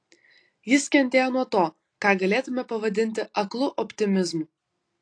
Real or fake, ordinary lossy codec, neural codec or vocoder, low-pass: real; AAC, 48 kbps; none; 9.9 kHz